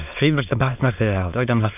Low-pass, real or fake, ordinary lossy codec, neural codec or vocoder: 3.6 kHz; fake; none; autoencoder, 22.05 kHz, a latent of 192 numbers a frame, VITS, trained on many speakers